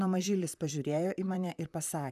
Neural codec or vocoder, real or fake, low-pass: vocoder, 44.1 kHz, 128 mel bands, Pupu-Vocoder; fake; 14.4 kHz